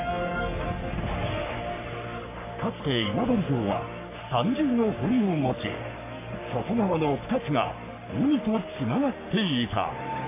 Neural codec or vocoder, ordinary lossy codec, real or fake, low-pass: codec, 44.1 kHz, 3.4 kbps, Pupu-Codec; MP3, 24 kbps; fake; 3.6 kHz